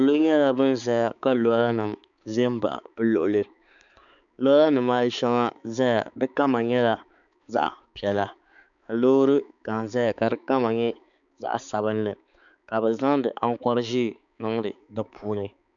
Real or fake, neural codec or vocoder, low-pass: fake; codec, 16 kHz, 4 kbps, X-Codec, HuBERT features, trained on balanced general audio; 7.2 kHz